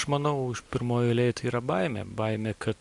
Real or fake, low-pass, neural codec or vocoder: real; 10.8 kHz; none